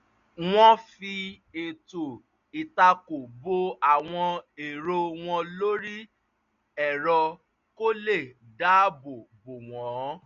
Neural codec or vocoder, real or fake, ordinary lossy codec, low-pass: none; real; none; 7.2 kHz